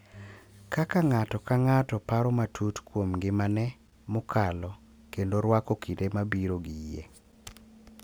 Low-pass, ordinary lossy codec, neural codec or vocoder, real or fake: none; none; none; real